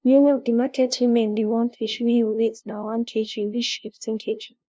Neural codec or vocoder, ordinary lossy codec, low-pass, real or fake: codec, 16 kHz, 0.5 kbps, FunCodec, trained on LibriTTS, 25 frames a second; none; none; fake